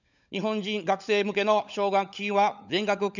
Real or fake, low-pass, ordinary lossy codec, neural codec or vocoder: fake; 7.2 kHz; none; codec, 16 kHz, 16 kbps, FunCodec, trained on LibriTTS, 50 frames a second